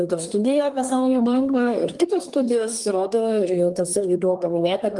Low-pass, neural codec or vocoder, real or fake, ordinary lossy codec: 10.8 kHz; codec, 24 kHz, 1 kbps, SNAC; fake; Opus, 24 kbps